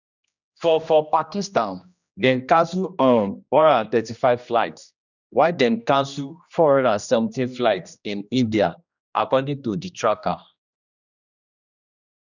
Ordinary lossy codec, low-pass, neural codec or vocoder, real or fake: none; 7.2 kHz; codec, 16 kHz, 1 kbps, X-Codec, HuBERT features, trained on general audio; fake